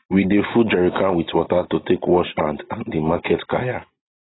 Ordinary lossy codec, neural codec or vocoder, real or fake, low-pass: AAC, 16 kbps; none; real; 7.2 kHz